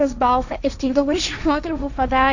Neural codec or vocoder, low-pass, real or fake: codec, 16 kHz, 1.1 kbps, Voila-Tokenizer; 7.2 kHz; fake